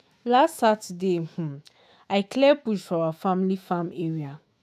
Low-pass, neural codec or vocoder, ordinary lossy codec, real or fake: 14.4 kHz; autoencoder, 48 kHz, 128 numbers a frame, DAC-VAE, trained on Japanese speech; none; fake